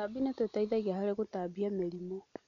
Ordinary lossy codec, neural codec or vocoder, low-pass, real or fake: none; none; 7.2 kHz; real